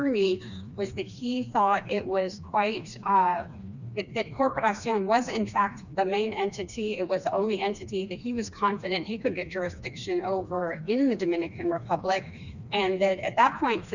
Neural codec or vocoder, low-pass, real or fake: codec, 16 kHz, 2 kbps, FreqCodec, smaller model; 7.2 kHz; fake